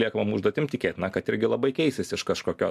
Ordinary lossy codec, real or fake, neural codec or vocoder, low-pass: AAC, 96 kbps; real; none; 14.4 kHz